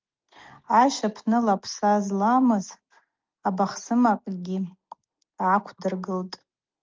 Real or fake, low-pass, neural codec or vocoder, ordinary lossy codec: real; 7.2 kHz; none; Opus, 32 kbps